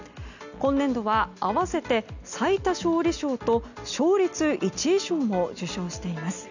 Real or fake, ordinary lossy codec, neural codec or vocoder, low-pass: real; none; none; 7.2 kHz